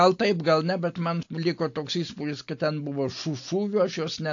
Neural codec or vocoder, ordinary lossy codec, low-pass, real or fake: none; AAC, 48 kbps; 7.2 kHz; real